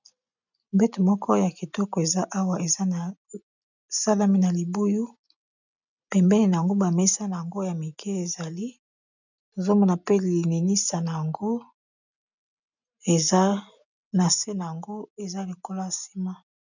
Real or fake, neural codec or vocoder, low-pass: real; none; 7.2 kHz